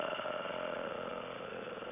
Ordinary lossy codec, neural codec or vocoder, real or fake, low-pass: none; none; real; 3.6 kHz